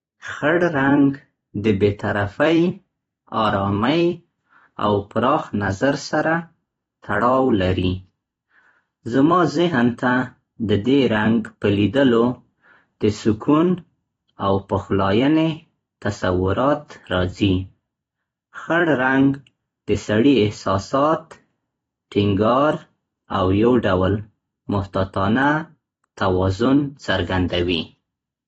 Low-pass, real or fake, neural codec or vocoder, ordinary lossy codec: 19.8 kHz; fake; vocoder, 44.1 kHz, 128 mel bands every 256 samples, BigVGAN v2; AAC, 24 kbps